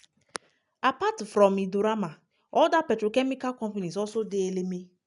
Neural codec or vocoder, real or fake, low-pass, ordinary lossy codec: none; real; 10.8 kHz; none